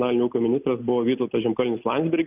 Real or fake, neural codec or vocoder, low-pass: real; none; 3.6 kHz